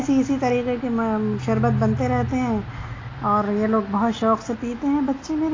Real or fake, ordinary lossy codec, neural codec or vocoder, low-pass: real; AAC, 32 kbps; none; 7.2 kHz